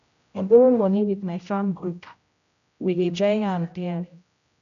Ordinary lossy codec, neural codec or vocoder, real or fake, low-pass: none; codec, 16 kHz, 0.5 kbps, X-Codec, HuBERT features, trained on general audio; fake; 7.2 kHz